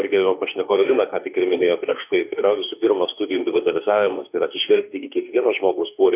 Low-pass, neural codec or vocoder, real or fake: 3.6 kHz; autoencoder, 48 kHz, 32 numbers a frame, DAC-VAE, trained on Japanese speech; fake